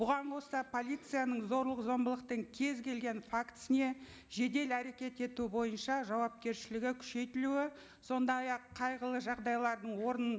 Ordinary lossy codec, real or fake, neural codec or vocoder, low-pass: none; real; none; none